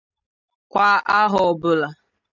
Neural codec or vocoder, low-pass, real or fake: none; 7.2 kHz; real